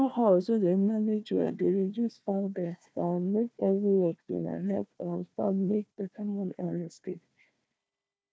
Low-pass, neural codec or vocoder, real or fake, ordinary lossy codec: none; codec, 16 kHz, 1 kbps, FunCodec, trained on Chinese and English, 50 frames a second; fake; none